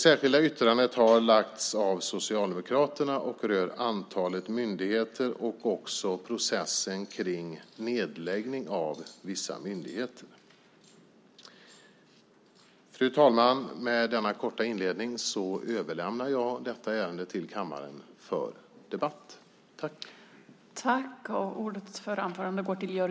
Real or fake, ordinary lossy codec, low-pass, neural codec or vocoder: real; none; none; none